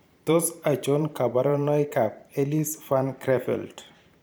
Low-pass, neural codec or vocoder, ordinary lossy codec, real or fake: none; vocoder, 44.1 kHz, 128 mel bands every 512 samples, BigVGAN v2; none; fake